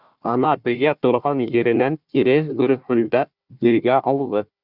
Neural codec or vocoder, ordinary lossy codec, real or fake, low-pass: codec, 16 kHz, 1 kbps, FunCodec, trained on Chinese and English, 50 frames a second; Opus, 64 kbps; fake; 5.4 kHz